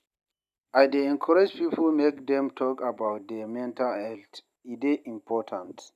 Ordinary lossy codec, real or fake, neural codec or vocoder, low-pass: none; real; none; 14.4 kHz